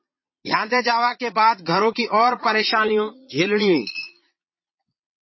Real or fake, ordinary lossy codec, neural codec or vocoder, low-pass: fake; MP3, 24 kbps; vocoder, 22.05 kHz, 80 mel bands, Vocos; 7.2 kHz